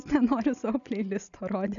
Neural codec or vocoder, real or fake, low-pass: none; real; 7.2 kHz